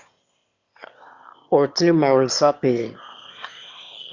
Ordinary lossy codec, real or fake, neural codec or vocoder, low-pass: Opus, 64 kbps; fake; autoencoder, 22.05 kHz, a latent of 192 numbers a frame, VITS, trained on one speaker; 7.2 kHz